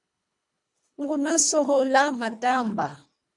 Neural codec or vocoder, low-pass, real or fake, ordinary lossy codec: codec, 24 kHz, 1.5 kbps, HILCodec; 10.8 kHz; fake; MP3, 96 kbps